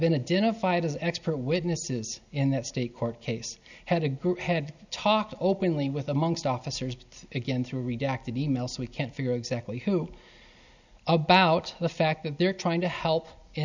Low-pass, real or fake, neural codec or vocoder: 7.2 kHz; real; none